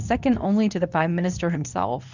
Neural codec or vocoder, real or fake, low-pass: codec, 24 kHz, 0.9 kbps, WavTokenizer, medium speech release version 2; fake; 7.2 kHz